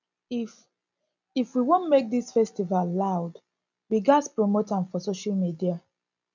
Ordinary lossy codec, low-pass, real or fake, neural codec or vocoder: none; 7.2 kHz; real; none